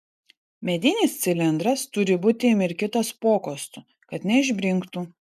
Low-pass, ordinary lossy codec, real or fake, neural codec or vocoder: 14.4 kHz; MP3, 96 kbps; real; none